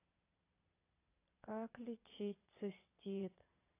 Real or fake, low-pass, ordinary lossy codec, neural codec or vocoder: fake; 3.6 kHz; AAC, 24 kbps; vocoder, 22.05 kHz, 80 mel bands, Vocos